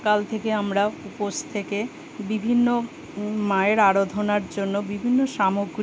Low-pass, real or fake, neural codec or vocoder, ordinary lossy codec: none; real; none; none